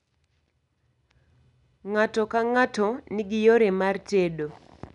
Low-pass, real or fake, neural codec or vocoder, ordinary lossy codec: 10.8 kHz; real; none; none